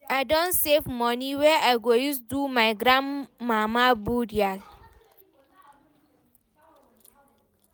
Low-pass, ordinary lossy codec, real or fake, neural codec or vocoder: none; none; real; none